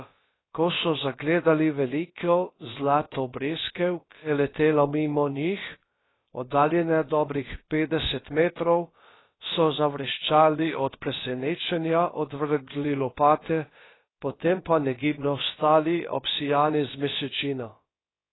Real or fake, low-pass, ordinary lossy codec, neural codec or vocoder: fake; 7.2 kHz; AAC, 16 kbps; codec, 16 kHz, about 1 kbps, DyCAST, with the encoder's durations